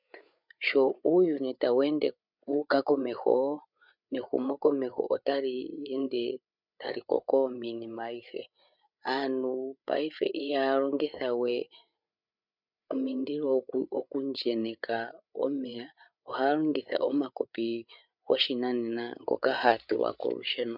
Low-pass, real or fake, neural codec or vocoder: 5.4 kHz; fake; codec, 16 kHz, 8 kbps, FreqCodec, larger model